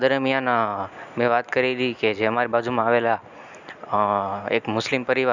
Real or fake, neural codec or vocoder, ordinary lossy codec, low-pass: real; none; none; 7.2 kHz